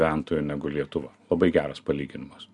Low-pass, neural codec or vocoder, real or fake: 10.8 kHz; none; real